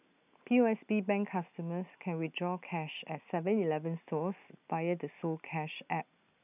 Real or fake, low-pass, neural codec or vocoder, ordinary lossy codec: real; 3.6 kHz; none; none